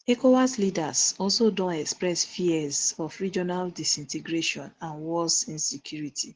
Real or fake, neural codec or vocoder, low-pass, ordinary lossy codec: real; none; 7.2 kHz; Opus, 16 kbps